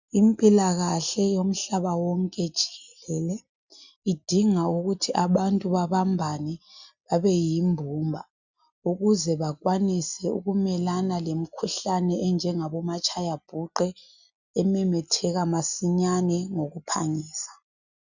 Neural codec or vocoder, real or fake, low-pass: none; real; 7.2 kHz